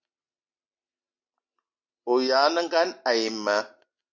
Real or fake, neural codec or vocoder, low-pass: real; none; 7.2 kHz